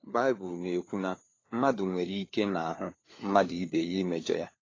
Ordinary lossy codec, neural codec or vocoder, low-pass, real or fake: AAC, 32 kbps; codec, 16 kHz, 4 kbps, FunCodec, trained on LibriTTS, 50 frames a second; 7.2 kHz; fake